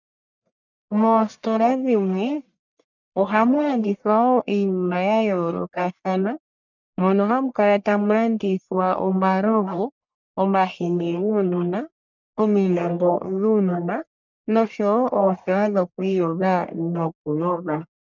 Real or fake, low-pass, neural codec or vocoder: fake; 7.2 kHz; codec, 44.1 kHz, 1.7 kbps, Pupu-Codec